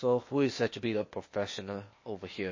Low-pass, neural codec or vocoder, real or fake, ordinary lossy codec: 7.2 kHz; codec, 16 kHz, 0.8 kbps, ZipCodec; fake; MP3, 32 kbps